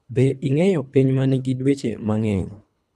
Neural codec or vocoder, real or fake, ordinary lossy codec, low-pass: codec, 24 kHz, 3 kbps, HILCodec; fake; none; none